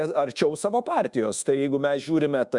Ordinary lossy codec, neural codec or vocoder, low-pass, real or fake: Opus, 64 kbps; codec, 24 kHz, 1.2 kbps, DualCodec; 10.8 kHz; fake